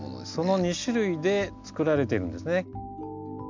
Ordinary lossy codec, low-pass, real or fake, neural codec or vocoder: MP3, 64 kbps; 7.2 kHz; real; none